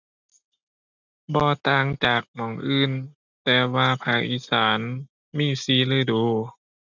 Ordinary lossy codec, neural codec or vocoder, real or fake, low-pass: none; none; real; 7.2 kHz